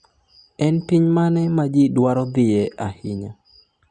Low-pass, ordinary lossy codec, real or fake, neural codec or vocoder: 9.9 kHz; Opus, 64 kbps; real; none